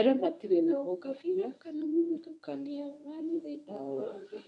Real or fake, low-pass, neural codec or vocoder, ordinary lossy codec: fake; 10.8 kHz; codec, 24 kHz, 0.9 kbps, WavTokenizer, medium speech release version 1; none